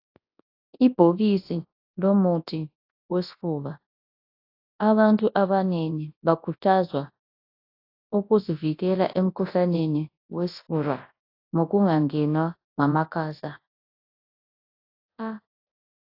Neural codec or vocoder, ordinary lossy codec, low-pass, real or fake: codec, 24 kHz, 0.9 kbps, WavTokenizer, large speech release; AAC, 32 kbps; 5.4 kHz; fake